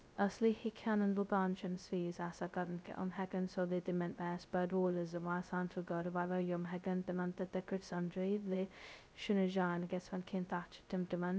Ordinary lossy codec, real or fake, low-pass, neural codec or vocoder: none; fake; none; codec, 16 kHz, 0.2 kbps, FocalCodec